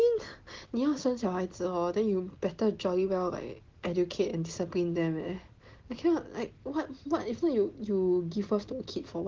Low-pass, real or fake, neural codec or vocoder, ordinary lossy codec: 7.2 kHz; real; none; Opus, 16 kbps